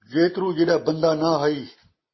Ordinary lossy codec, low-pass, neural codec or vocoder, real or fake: MP3, 24 kbps; 7.2 kHz; none; real